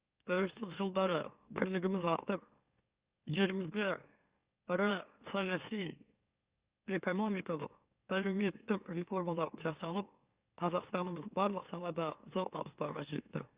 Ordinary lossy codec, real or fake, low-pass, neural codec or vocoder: Opus, 64 kbps; fake; 3.6 kHz; autoencoder, 44.1 kHz, a latent of 192 numbers a frame, MeloTTS